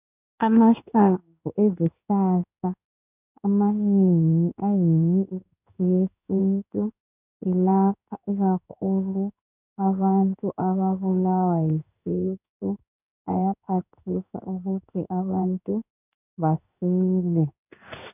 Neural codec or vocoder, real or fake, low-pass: codec, 16 kHz in and 24 kHz out, 1 kbps, XY-Tokenizer; fake; 3.6 kHz